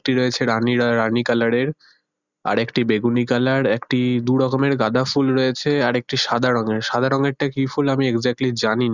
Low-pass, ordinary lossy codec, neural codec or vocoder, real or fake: 7.2 kHz; none; none; real